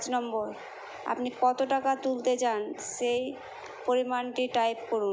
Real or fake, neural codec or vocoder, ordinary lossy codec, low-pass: real; none; none; none